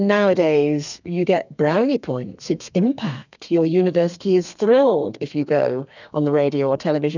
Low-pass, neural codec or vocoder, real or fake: 7.2 kHz; codec, 32 kHz, 1.9 kbps, SNAC; fake